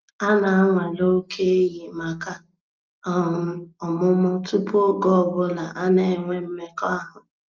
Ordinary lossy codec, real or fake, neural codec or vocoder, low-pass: Opus, 24 kbps; real; none; 7.2 kHz